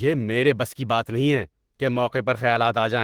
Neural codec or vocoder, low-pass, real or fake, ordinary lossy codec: autoencoder, 48 kHz, 32 numbers a frame, DAC-VAE, trained on Japanese speech; 19.8 kHz; fake; Opus, 16 kbps